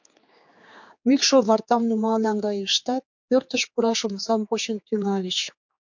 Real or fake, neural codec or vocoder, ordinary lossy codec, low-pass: fake; codec, 16 kHz, 4 kbps, X-Codec, HuBERT features, trained on general audio; MP3, 48 kbps; 7.2 kHz